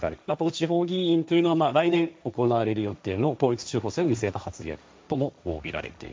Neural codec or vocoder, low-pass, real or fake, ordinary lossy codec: codec, 16 kHz, 1.1 kbps, Voila-Tokenizer; none; fake; none